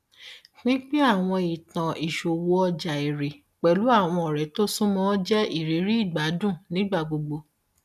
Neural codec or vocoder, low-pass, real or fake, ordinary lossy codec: none; 14.4 kHz; real; none